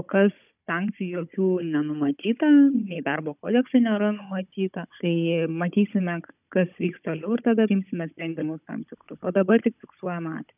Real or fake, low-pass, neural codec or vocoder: fake; 3.6 kHz; codec, 16 kHz, 16 kbps, FunCodec, trained on Chinese and English, 50 frames a second